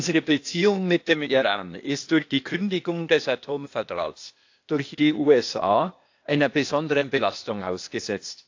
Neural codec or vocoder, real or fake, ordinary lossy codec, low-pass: codec, 16 kHz, 0.8 kbps, ZipCodec; fake; AAC, 48 kbps; 7.2 kHz